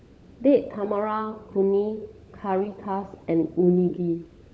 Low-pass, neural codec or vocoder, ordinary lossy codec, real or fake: none; codec, 16 kHz, 16 kbps, FunCodec, trained on LibriTTS, 50 frames a second; none; fake